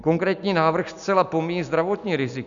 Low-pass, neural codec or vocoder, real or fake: 7.2 kHz; none; real